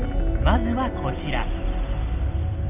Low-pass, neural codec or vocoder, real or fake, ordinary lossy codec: 3.6 kHz; none; real; none